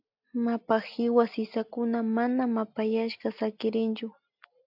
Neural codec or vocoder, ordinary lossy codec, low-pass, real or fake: none; AAC, 48 kbps; 5.4 kHz; real